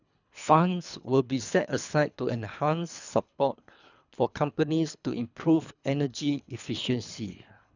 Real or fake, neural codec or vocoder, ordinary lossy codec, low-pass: fake; codec, 24 kHz, 3 kbps, HILCodec; none; 7.2 kHz